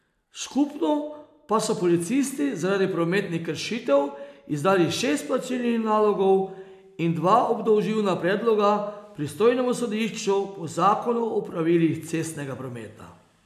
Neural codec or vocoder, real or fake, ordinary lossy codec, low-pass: none; real; AAC, 96 kbps; 14.4 kHz